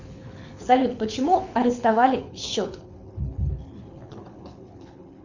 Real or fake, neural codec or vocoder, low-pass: fake; codec, 24 kHz, 6 kbps, HILCodec; 7.2 kHz